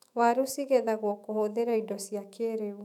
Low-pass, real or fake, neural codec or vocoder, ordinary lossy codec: 19.8 kHz; fake; autoencoder, 48 kHz, 128 numbers a frame, DAC-VAE, trained on Japanese speech; none